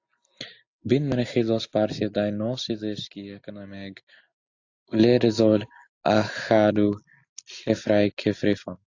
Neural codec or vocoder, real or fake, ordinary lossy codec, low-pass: none; real; AAC, 48 kbps; 7.2 kHz